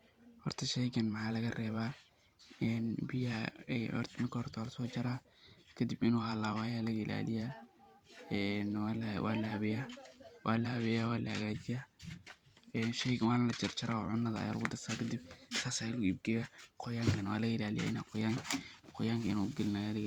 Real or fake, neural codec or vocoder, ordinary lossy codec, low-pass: fake; vocoder, 44.1 kHz, 128 mel bands every 512 samples, BigVGAN v2; Opus, 64 kbps; 19.8 kHz